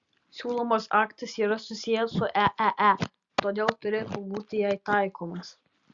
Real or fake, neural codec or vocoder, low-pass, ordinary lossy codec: real; none; 7.2 kHz; Opus, 64 kbps